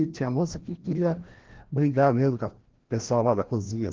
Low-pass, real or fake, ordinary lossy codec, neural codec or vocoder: 7.2 kHz; fake; Opus, 16 kbps; codec, 16 kHz, 1 kbps, FreqCodec, larger model